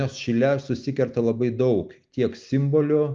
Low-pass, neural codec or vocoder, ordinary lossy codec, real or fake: 7.2 kHz; none; Opus, 24 kbps; real